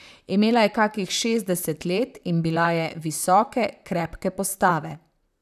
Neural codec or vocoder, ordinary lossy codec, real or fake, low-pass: vocoder, 44.1 kHz, 128 mel bands, Pupu-Vocoder; none; fake; 14.4 kHz